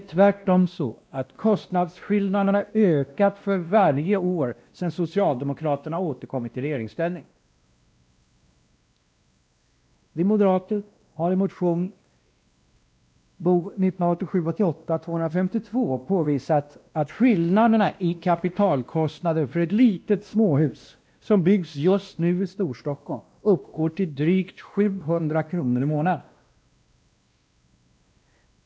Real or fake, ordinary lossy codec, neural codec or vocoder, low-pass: fake; none; codec, 16 kHz, 1 kbps, X-Codec, WavLM features, trained on Multilingual LibriSpeech; none